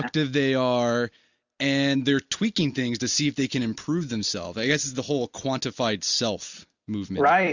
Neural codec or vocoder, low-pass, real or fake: none; 7.2 kHz; real